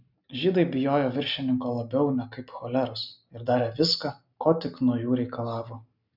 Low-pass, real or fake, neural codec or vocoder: 5.4 kHz; real; none